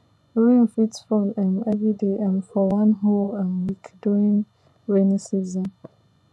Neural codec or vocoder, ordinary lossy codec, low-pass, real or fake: vocoder, 24 kHz, 100 mel bands, Vocos; none; none; fake